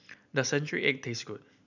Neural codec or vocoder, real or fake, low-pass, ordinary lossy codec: none; real; 7.2 kHz; none